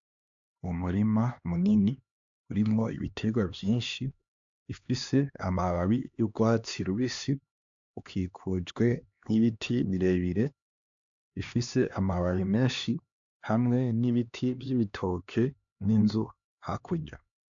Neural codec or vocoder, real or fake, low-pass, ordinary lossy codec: codec, 16 kHz, 2 kbps, X-Codec, HuBERT features, trained on LibriSpeech; fake; 7.2 kHz; AAC, 64 kbps